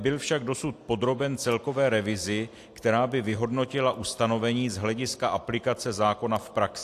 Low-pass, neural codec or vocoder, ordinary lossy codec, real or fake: 14.4 kHz; none; AAC, 64 kbps; real